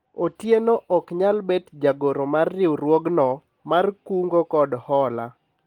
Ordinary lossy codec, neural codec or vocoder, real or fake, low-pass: Opus, 24 kbps; none; real; 19.8 kHz